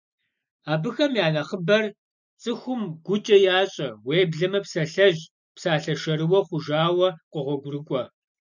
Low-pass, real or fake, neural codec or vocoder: 7.2 kHz; real; none